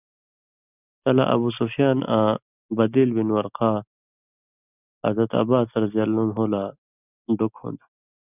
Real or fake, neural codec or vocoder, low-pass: real; none; 3.6 kHz